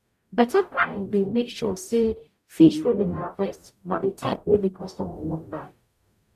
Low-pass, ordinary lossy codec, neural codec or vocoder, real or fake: 14.4 kHz; none; codec, 44.1 kHz, 0.9 kbps, DAC; fake